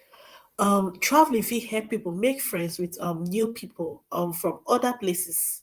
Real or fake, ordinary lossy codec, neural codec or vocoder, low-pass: fake; Opus, 32 kbps; vocoder, 44.1 kHz, 128 mel bands, Pupu-Vocoder; 14.4 kHz